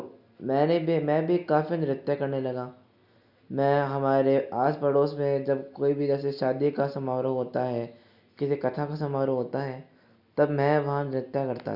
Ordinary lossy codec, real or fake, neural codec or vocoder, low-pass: none; real; none; 5.4 kHz